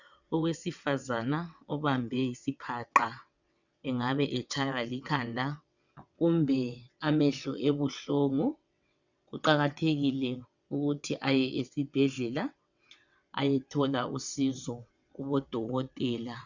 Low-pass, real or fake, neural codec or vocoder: 7.2 kHz; fake; vocoder, 22.05 kHz, 80 mel bands, WaveNeXt